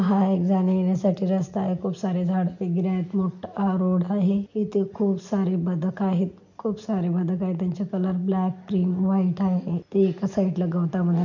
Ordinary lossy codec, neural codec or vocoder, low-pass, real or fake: none; none; 7.2 kHz; real